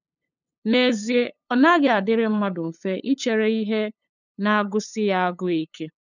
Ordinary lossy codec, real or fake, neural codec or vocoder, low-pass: none; fake; codec, 16 kHz, 8 kbps, FunCodec, trained on LibriTTS, 25 frames a second; 7.2 kHz